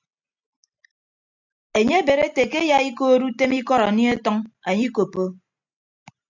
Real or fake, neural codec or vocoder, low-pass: real; none; 7.2 kHz